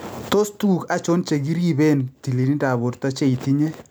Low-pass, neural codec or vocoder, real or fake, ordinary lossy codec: none; none; real; none